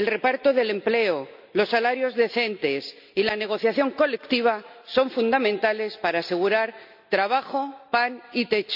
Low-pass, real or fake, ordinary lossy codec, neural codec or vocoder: 5.4 kHz; real; none; none